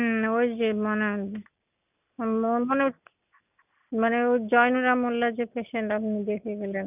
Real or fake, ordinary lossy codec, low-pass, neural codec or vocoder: real; none; 3.6 kHz; none